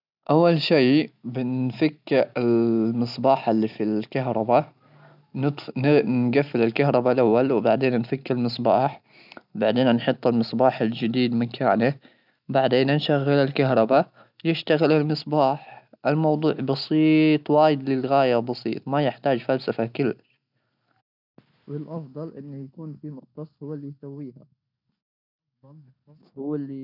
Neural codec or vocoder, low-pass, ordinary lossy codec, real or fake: none; 5.4 kHz; none; real